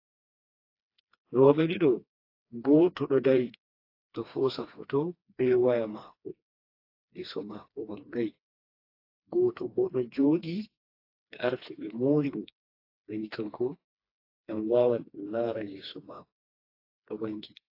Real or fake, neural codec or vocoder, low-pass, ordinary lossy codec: fake; codec, 16 kHz, 2 kbps, FreqCodec, smaller model; 5.4 kHz; AAC, 32 kbps